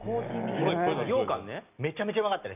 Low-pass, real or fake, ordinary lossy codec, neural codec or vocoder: 3.6 kHz; real; none; none